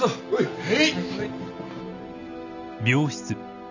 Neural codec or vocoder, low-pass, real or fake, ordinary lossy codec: none; 7.2 kHz; real; none